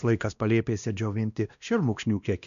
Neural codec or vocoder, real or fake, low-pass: codec, 16 kHz, 1 kbps, X-Codec, WavLM features, trained on Multilingual LibriSpeech; fake; 7.2 kHz